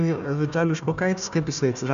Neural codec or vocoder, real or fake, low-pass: codec, 16 kHz, 1 kbps, FunCodec, trained on Chinese and English, 50 frames a second; fake; 7.2 kHz